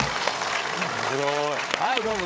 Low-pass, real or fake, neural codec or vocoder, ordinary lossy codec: none; fake; codec, 16 kHz, 8 kbps, FreqCodec, larger model; none